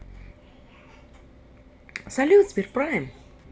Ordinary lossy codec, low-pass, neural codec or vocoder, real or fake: none; none; none; real